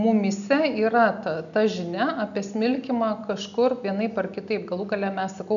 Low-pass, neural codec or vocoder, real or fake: 7.2 kHz; none; real